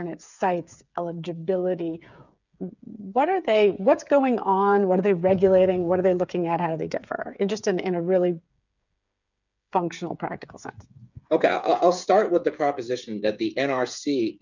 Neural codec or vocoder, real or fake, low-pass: codec, 16 kHz, 8 kbps, FreqCodec, smaller model; fake; 7.2 kHz